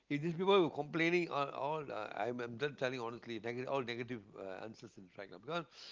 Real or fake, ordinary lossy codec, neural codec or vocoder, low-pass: real; Opus, 32 kbps; none; 7.2 kHz